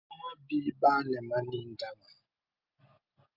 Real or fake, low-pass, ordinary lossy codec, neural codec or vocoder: real; 5.4 kHz; Opus, 24 kbps; none